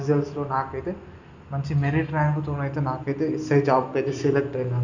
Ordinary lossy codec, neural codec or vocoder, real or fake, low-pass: none; none; real; 7.2 kHz